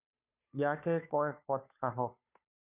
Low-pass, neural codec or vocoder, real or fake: 3.6 kHz; codec, 16 kHz, 2 kbps, FunCodec, trained on Chinese and English, 25 frames a second; fake